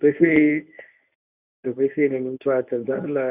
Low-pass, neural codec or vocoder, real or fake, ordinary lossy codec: 3.6 kHz; codec, 24 kHz, 0.9 kbps, WavTokenizer, medium speech release version 1; fake; none